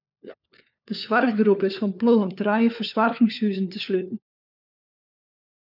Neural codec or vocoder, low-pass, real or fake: codec, 16 kHz, 4 kbps, FunCodec, trained on LibriTTS, 50 frames a second; 5.4 kHz; fake